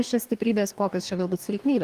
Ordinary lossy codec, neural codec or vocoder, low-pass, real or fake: Opus, 24 kbps; codec, 44.1 kHz, 2.6 kbps, DAC; 14.4 kHz; fake